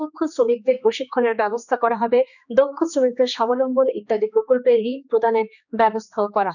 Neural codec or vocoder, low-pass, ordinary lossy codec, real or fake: codec, 16 kHz, 2 kbps, X-Codec, HuBERT features, trained on general audio; 7.2 kHz; none; fake